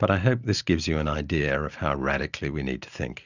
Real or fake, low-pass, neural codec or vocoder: real; 7.2 kHz; none